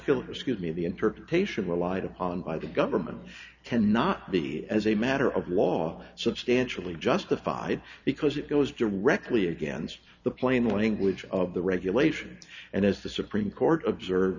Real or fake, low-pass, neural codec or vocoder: real; 7.2 kHz; none